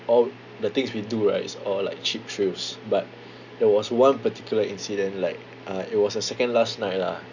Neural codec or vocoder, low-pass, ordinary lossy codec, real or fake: none; 7.2 kHz; none; real